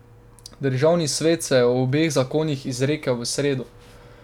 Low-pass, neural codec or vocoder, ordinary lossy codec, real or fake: 19.8 kHz; none; none; real